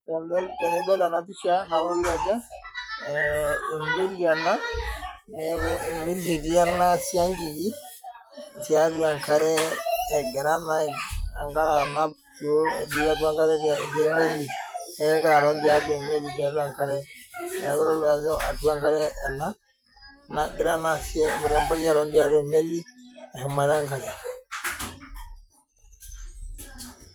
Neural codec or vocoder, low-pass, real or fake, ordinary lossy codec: vocoder, 44.1 kHz, 128 mel bands, Pupu-Vocoder; none; fake; none